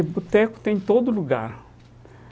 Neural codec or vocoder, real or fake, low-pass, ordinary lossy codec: none; real; none; none